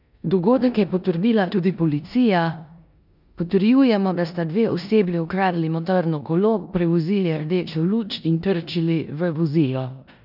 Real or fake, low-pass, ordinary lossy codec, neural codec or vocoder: fake; 5.4 kHz; none; codec, 16 kHz in and 24 kHz out, 0.9 kbps, LongCat-Audio-Codec, four codebook decoder